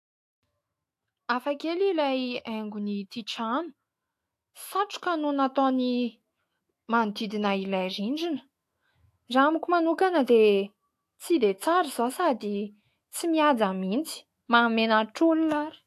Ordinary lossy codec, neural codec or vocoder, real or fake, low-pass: AAC, 64 kbps; autoencoder, 48 kHz, 128 numbers a frame, DAC-VAE, trained on Japanese speech; fake; 14.4 kHz